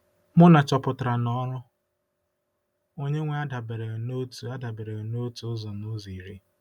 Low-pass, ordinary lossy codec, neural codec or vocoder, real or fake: 19.8 kHz; none; none; real